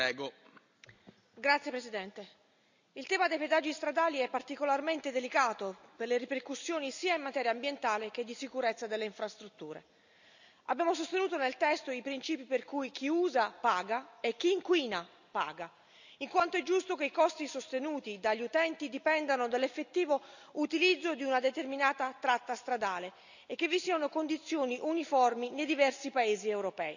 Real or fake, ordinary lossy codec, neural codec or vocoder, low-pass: real; none; none; 7.2 kHz